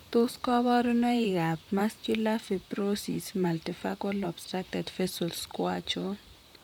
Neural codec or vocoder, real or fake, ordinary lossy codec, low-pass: vocoder, 44.1 kHz, 128 mel bands, Pupu-Vocoder; fake; none; 19.8 kHz